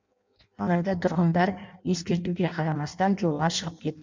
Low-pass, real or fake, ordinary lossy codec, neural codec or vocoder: 7.2 kHz; fake; MP3, 64 kbps; codec, 16 kHz in and 24 kHz out, 0.6 kbps, FireRedTTS-2 codec